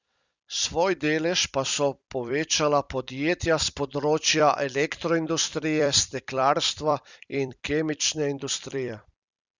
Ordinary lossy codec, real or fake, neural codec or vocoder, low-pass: Opus, 64 kbps; fake; vocoder, 44.1 kHz, 128 mel bands every 256 samples, BigVGAN v2; 7.2 kHz